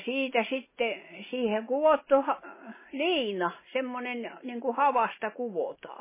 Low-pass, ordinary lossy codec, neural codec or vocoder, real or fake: 3.6 kHz; MP3, 16 kbps; none; real